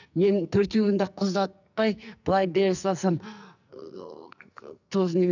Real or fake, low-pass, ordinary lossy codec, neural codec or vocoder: fake; 7.2 kHz; none; codec, 44.1 kHz, 2.6 kbps, SNAC